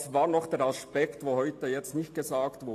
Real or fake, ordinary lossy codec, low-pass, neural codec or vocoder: real; AAC, 64 kbps; 14.4 kHz; none